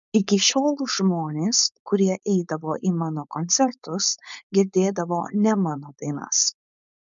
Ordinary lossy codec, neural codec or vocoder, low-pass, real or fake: MP3, 96 kbps; codec, 16 kHz, 4.8 kbps, FACodec; 7.2 kHz; fake